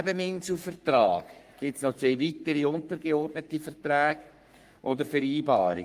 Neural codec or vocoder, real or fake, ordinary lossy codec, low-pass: codec, 44.1 kHz, 3.4 kbps, Pupu-Codec; fake; Opus, 32 kbps; 14.4 kHz